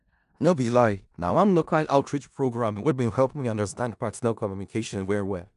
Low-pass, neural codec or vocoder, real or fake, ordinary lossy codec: 10.8 kHz; codec, 16 kHz in and 24 kHz out, 0.4 kbps, LongCat-Audio-Codec, four codebook decoder; fake; none